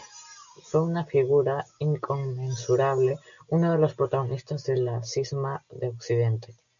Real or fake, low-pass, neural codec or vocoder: real; 7.2 kHz; none